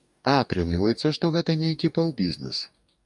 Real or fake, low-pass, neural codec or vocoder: fake; 10.8 kHz; codec, 44.1 kHz, 2.6 kbps, DAC